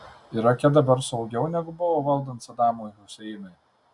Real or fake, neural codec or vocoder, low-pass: real; none; 10.8 kHz